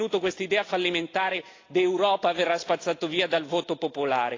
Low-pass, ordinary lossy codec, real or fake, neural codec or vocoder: 7.2 kHz; AAC, 32 kbps; real; none